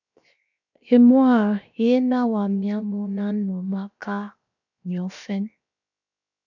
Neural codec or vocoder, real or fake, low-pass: codec, 16 kHz, 0.7 kbps, FocalCodec; fake; 7.2 kHz